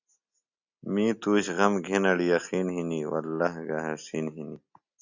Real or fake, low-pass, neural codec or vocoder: real; 7.2 kHz; none